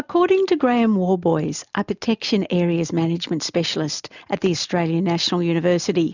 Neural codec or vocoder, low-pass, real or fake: none; 7.2 kHz; real